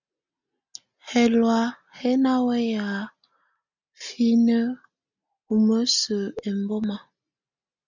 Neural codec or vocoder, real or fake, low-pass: none; real; 7.2 kHz